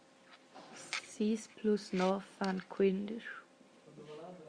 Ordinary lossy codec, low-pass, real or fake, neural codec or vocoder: Opus, 64 kbps; 9.9 kHz; real; none